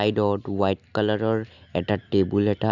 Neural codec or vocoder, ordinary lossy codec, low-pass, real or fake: none; none; 7.2 kHz; real